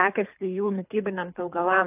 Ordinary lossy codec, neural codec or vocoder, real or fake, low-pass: AAC, 32 kbps; codec, 24 kHz, 3 kbps, HILCodec; fake; 3.6 kHz